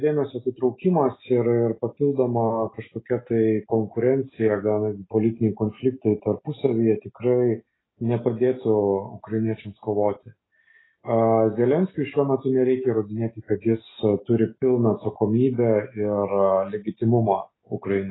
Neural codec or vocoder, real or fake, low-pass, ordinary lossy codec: none; real; 7.2 kHz; AAC, 16 kbps